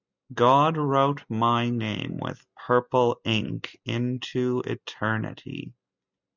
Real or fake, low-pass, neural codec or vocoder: real; 7.2 kHz; none